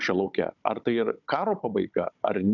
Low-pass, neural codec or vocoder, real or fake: 7.2 kHz; codec, 16 kHz, 16 kbps, FunCodec, trained on Chinese and English, 50 frames a second; fake